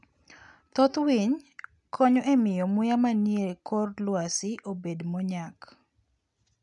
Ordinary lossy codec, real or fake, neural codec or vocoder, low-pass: none; real; none; 10.8 kHz